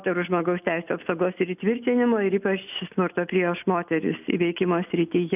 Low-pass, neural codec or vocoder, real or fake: 3.6 kHz; none; real